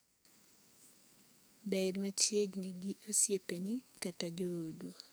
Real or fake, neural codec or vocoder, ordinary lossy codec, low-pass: fake; codec, 44.1 kHz, 2.6 kbps, SNAC; none; none